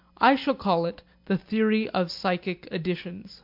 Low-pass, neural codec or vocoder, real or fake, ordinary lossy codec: 5.4 kHz; none; real; MP3, 48 kbps